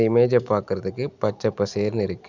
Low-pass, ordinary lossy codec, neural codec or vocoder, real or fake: 7.2 kHz; none; none; real